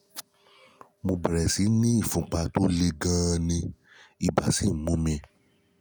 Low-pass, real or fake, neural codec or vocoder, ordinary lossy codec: none; real; none; none